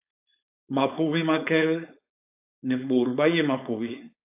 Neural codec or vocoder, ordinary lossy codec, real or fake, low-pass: codec, 16 kHz, 4.8 kbps, FACodec; AAC, 32 kbps; fake; 3.6 kHz